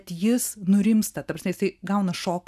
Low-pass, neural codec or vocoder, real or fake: 14.4 kHz; none; real